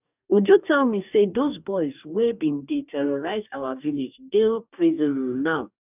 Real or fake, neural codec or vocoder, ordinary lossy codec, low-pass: fake; codec, 44.1 kHz, 2.6 kbps, DAC; none; 3.6 kHz